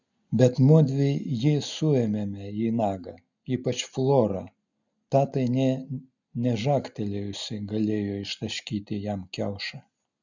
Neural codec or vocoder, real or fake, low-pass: none; real; 7.2 kHz